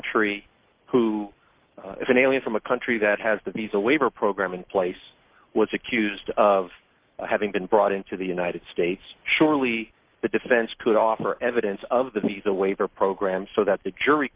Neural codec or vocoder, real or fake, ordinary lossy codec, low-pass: none; real; Opus, 32 kbps; 3.6 kHz